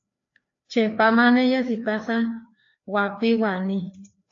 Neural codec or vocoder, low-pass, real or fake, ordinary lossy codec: codec, 16 kHz, 2 kbps, FreqCodec, larger model; 7.2 kHz; fake; AAC, 48 kbps